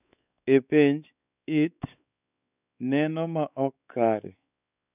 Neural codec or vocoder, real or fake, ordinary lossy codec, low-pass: codec, 16 kHz, 4 kbps, X-Codec, WavLM features, trained on Multilingual LibriSpeech; fake; none; 3.6 kHz